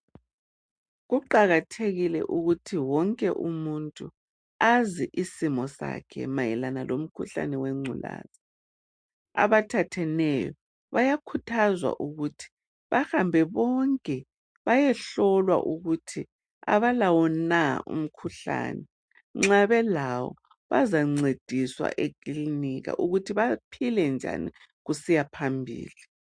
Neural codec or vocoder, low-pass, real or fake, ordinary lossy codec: none; 9.9 kHz; real; MP3, 64 kbps